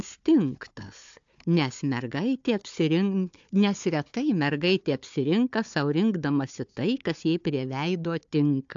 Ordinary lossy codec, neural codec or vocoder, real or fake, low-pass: AAC, 64 kbps; codec, 16 kHz, 4 kbps, FunCodec, trained on Chinese and English, 50 frames a second; fake; 7.2 kHz